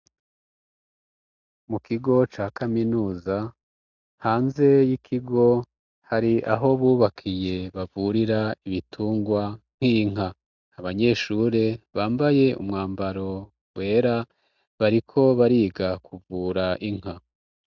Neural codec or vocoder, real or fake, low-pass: none; real; 7.2 kHz